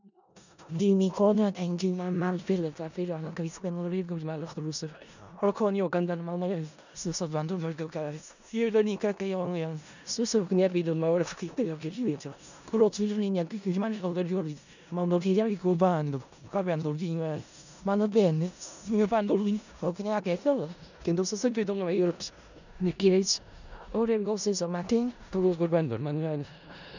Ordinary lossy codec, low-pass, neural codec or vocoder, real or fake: none; 7.2 kHz; codec, 16 kHz in and 24 kHz out, 0.4 kbps, LongCat-Audio-Codec, four codebook decoder; fake